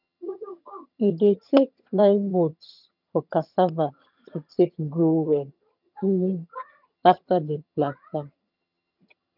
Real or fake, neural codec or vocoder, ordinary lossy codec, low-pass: fake; vocoder, 22.05 kHz, 80 mel bands, HiFi-GAN; none; 5.4 kHz